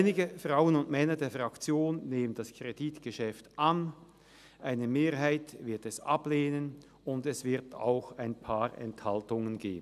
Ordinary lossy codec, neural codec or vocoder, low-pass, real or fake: none; none; 14.4 kHz; real